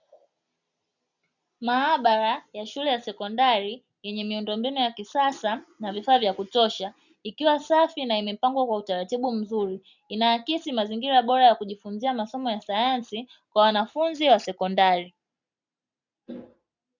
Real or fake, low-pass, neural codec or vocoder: real; 7.2 kHz; none